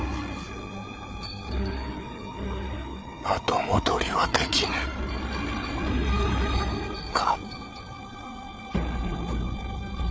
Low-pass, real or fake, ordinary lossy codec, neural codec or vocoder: none; fake; none; codec, 16 kHz, 8 kbps, FreqCodec, larger model